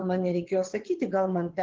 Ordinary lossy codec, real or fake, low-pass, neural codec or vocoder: Opus, 16 kbps; fake; 7.2 kHz; vocoder, 22.05 kHz, 80 mel bands, WaveNeXt